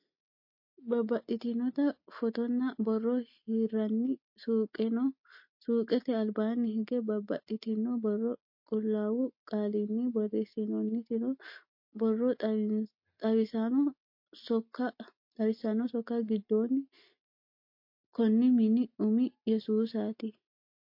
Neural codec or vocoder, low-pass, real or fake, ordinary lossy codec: none; 5.4 kHz; real; MP3, 32 kbps